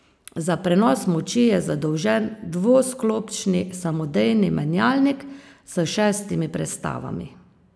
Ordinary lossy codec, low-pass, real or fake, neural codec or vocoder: none; none; real; none